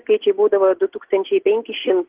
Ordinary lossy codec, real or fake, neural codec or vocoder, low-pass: Opus, 16 kbps; real; none; 3.6 kHz